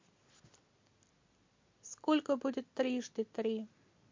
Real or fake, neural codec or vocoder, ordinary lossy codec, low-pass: real; none; MP3, 48 kbps; 7.2 kHz